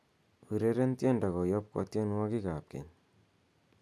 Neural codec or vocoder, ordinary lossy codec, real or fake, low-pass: none; none; real; none